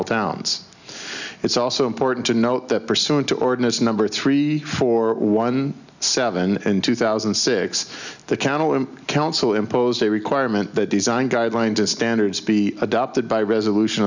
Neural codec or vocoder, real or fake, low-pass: none; real; 7.2 kHz